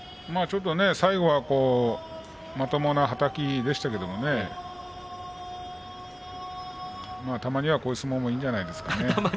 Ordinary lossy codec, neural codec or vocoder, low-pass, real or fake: none; none; none; real